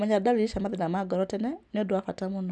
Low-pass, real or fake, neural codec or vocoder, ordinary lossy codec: none; real; none; none